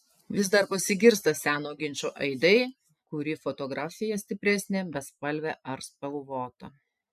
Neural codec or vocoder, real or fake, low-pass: none; real; 14.4 kHz